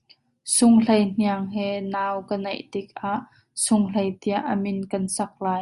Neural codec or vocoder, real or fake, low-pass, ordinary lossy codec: none; real; 10.8 kHz; Opus, 64 kbps